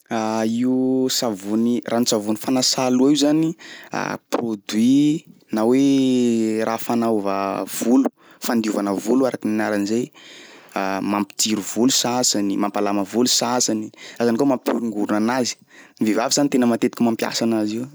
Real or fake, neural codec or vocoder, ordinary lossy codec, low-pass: real; none; none; none